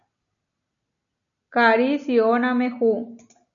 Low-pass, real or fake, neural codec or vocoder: 7.2 kHz; real; none